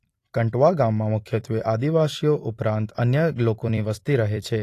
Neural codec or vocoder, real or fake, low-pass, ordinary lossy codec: vocoder, 44.1 kHz, 128 mel bands every 256 samples, BigVGAN v2; fake; 14.4 kHz; AAC, 48 kbps